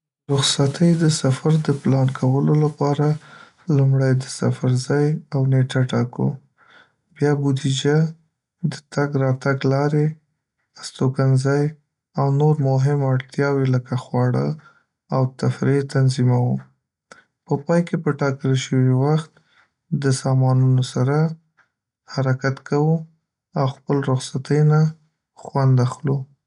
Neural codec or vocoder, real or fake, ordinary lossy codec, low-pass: none; real; none; 10.8 kHz